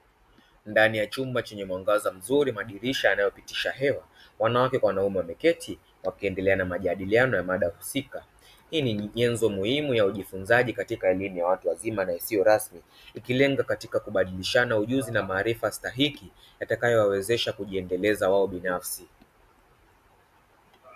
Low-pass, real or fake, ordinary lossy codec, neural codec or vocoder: 14.4 kHz; real; AAC, 96 kbps; none